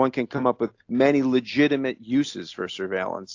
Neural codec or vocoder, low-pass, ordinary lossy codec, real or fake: none; 7.2 kHz; AAC, 48 kbps; real